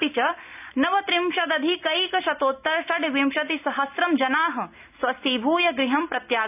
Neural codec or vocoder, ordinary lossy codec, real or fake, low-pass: none; none; real; 3.6 kHz